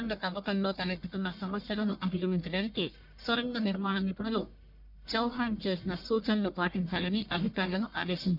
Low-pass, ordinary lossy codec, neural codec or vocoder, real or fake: 5.4 kHz; none; codec, 44.1 kHz, 1.7 kbps, Pupu-Codec; fake